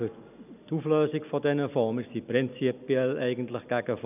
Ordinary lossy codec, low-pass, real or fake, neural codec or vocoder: none; 3.6 kHz; real; none